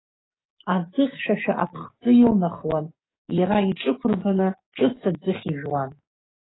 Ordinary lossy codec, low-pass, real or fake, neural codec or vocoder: AAC, 16 kbps; 7.2 kHz; fake; codec, 44.1 kHz, 7.8 kbps, DAC